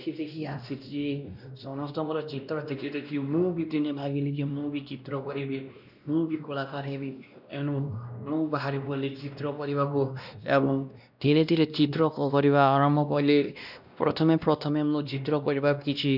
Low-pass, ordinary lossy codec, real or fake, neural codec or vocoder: 5.4 kHz; none; fake; codec, 16 kHz, 1 kbps, X-Codec, WavLM features, trained on Multilingual LibriSpeech